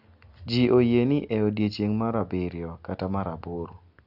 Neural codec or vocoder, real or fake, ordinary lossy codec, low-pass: none; real; none; 5.4 kHz